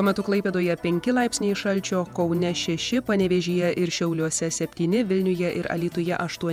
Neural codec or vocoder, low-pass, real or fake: vocoder, 48 kHz, 128 mel bands, Vocos; 19.8 kHz; fake